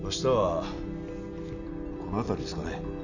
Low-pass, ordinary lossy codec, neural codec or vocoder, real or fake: 7.2 kHz; none; none; real